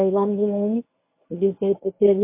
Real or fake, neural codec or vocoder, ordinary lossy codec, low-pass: fake; codec, 24 kHz, 0.9 kbps, WavTokenizer, medium speech release version 1; MP3, 24 kbps; 3.6 kHz